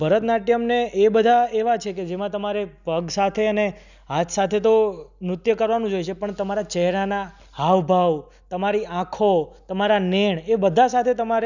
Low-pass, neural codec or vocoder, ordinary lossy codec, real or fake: 7.2 kHz; none; none; real